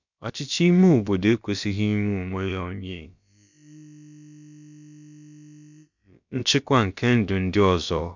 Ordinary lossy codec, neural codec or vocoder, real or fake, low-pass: none; codec, 16 kHz, about 1 kbps, DyCAST, with the encoder's durations; fake; 7.2 kHz